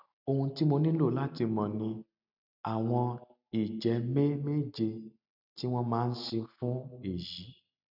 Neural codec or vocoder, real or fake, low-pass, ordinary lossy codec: none; real; 5.4 kHz; none